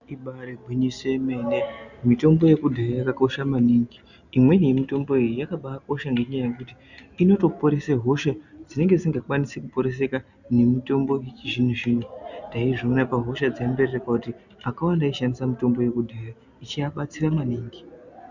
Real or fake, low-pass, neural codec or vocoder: real; 7.2 kHz; none